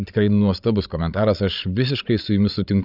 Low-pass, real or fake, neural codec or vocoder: 5.4 kHz; fake; codec, 16 kHz, 16 kbps, FreqCodec, larger model